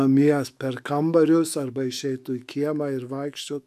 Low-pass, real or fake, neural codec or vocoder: 14.4 kHz; fake; autoencoder, 48 kHz, 128 numbers a frame, DAC-VAE, trained on Japanese speech